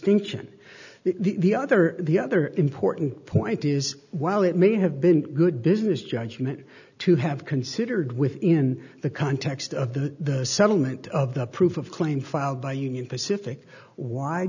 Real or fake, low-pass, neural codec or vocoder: real; 7.2 kHz; none